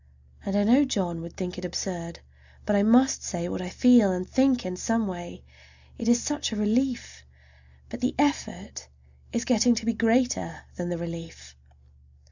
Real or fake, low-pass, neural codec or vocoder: real; 7.2 kHz; none